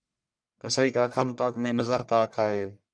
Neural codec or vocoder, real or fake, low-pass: codec, 44.1 kHz, 1.7 kbps, Pupu-Codec; fake; 9.9 kHz